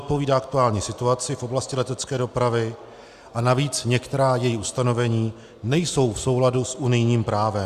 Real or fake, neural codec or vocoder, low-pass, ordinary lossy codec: real; none; 14.4 kHz; Opus, 64 kbps